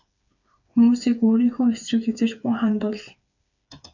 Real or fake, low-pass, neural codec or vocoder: fake; 7.2 kHz; codec, 16 kHz, 4 kbps, FreqCodec, smaller model